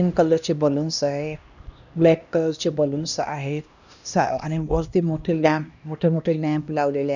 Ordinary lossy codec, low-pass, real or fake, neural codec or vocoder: none; 7.2 kHz; fake; codec, 16 kHz, 1 kbps, X-Codec, HuBERT features, trained on LibriSpeech